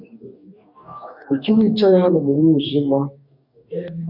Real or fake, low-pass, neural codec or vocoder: fake; 5.4 kHz; codec, 44.1 kHz, 2.6 kbps, DAC